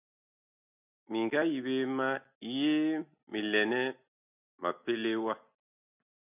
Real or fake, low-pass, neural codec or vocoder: real; 3.6 kHz; none